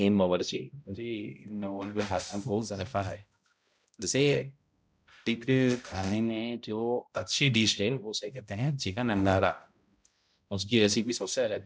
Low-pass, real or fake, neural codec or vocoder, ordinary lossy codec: none; fake; codec, 16 kHz, 0.5 kbps, X-Codec, HuBERT features, trained on balanced general audio; none